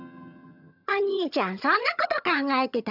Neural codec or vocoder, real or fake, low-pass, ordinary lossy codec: vocoder, 22.05 kHz, 80 mel bands, HiFi-GAN; fake; 5.4 kHz; none